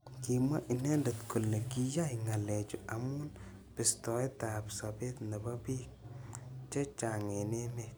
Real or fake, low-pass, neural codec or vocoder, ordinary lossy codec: real; none; none; none